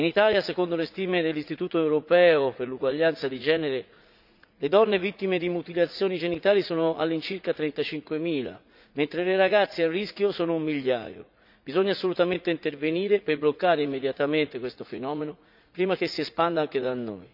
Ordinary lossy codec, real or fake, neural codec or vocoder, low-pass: none; fake; vocoder, 44.1 kHz, 80 mel bands, Vocos; 5.4 kHz